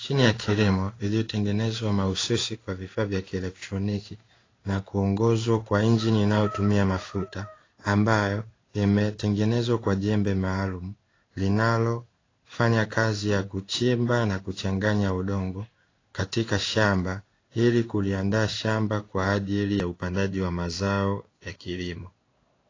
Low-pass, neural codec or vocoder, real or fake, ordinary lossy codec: 7.2 kHz; codec, 16 kHz in and 24 kHz out, 1 kbps, XY-Tokenizer; fake; AAC, 32 kbps